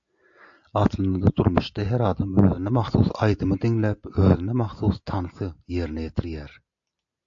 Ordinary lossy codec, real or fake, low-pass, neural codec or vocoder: AAC, 64 kbps; real; 7.2 kHz; none